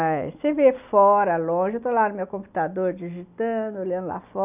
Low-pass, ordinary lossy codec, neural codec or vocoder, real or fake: 3.6 kHz; none; none; real